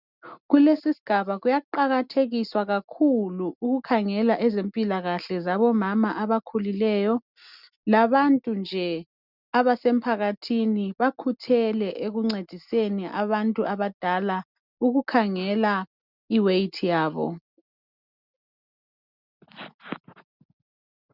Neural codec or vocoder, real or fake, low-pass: none; real; 5.4 kHz